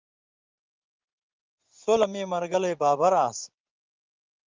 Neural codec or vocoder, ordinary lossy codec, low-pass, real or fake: vocoder, 24 kHz, 100 mel bands, Vocos; Opus, 24 kbps; 7.2 kHz; fake